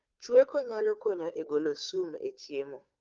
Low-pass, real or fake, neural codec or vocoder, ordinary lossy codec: 7.2 kHz; fake; codec, 16 kHz, 2 kbps, FunCodec, trained on Chinese and English, 25 frames a second; Opus, 32 kbps